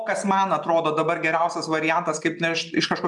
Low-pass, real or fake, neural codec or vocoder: 10.8 kHz; real; none